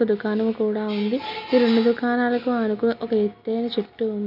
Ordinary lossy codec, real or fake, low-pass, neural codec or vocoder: AAC, 48 kbps; real; 5.4 kHz; none